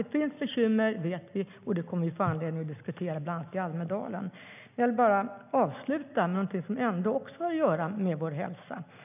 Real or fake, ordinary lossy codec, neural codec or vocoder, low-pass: real; none; none; 3.6 kHz